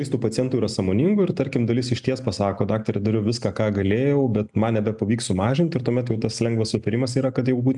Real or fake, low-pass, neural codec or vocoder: real; 10.8 kHz; none